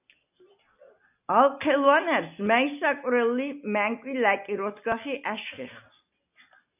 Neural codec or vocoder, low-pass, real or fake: none; 3.6 kHz; real